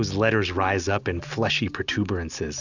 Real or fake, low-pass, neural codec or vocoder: real; 7.2 kHz; none